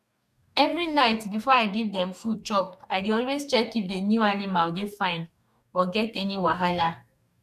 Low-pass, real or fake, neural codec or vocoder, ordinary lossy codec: 14.4 kHz; fake; codec, 44.1 kHz, 2.6 kbps, DAC; none